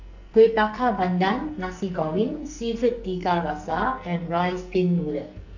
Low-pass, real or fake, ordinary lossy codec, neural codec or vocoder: 7.2 kHz; fake; none; codec, 44.1 kHz, 2.6 kbps, SNAC